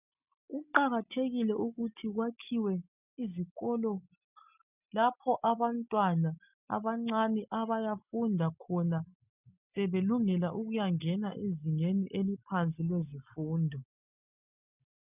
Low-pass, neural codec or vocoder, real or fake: 3.6 kHz; none; real